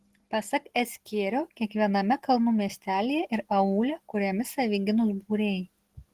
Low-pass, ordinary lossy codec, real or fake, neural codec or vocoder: 14.4 kHz; Opus, 16 kbps; real; none